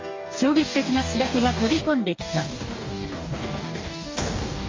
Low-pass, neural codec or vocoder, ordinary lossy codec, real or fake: 7.2 kHz; codec, 44.1 kHz, 2.6 kbps, DAC; MP3, 48 kbps; fake